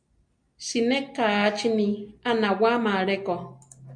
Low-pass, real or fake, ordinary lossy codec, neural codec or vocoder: 9.9 kHz; real; MP3, 64 kbps; none